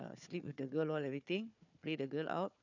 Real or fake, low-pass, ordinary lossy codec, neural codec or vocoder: fake; 7.2 kHz; none; codec, 16 kHz, 4 kbps, FunCodec, trained on Chinese and English, 50 frames a second